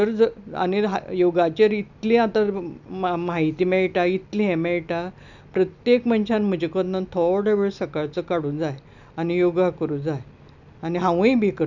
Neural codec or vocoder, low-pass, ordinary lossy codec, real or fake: none; 7.2 kHz; none; real